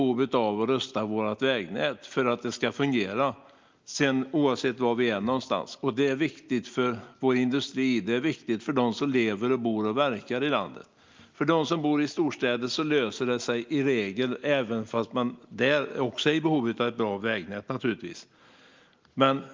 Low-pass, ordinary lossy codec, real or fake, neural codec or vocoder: 7.2 kHz; Opus, 24 kbps; real; none